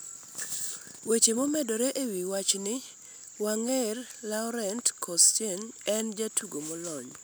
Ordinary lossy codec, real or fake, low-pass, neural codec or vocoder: none; real; none; none